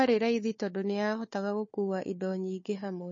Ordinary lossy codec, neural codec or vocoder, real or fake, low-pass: MP3, 32 kbps; codec, 16 kHz, 4 kbps, FunCodec, trained on Chinese and English, 50 frames a second; fake; 7.2 kHz